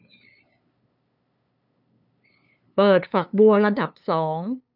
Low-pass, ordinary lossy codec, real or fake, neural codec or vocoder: 5.4 kHz; none; fake; codec, 16 kHz, 2 kbps, FunCodec, trained on LibriTTS, 25 frames a second